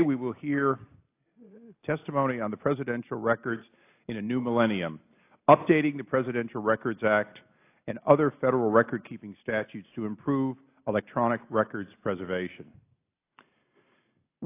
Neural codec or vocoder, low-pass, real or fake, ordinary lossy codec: none; 3.6 kHz; real; AAC, 24 kbps